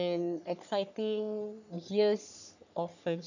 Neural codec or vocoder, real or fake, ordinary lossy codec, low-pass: codec, 44.1 kHz, 3.4 kbps, Pupu-Codec; fake; none; 7.2 kHz